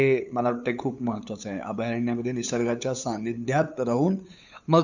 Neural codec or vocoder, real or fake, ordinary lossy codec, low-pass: codec, 16 kHz, 8 kbps, FunCodec, trained on LibriTTS, 25 frames a second; fake; none; 7.2 kHz